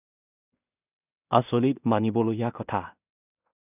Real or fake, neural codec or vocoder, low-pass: fake; codec, 16 kHz in and 24 kHz out, 0.4 kbps, LongCat-Audio-Codec, two codebook decoder; 3.6 kHz